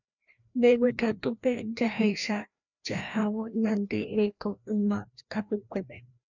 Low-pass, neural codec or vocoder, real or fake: 7.2 kHz; codec, 16 kHz, 1 kbps, FreqCodec, larger model; fake